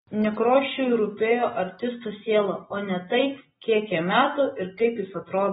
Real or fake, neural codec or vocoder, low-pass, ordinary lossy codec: real; none; 19.8 kHz; AAC, 16 kbps